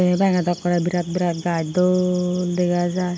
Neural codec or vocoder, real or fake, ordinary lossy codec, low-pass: none; real; none; none